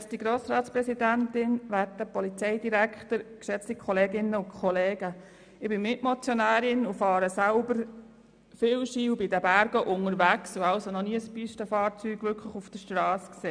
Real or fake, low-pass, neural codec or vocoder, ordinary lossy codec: real; 9.9 kHz; none; none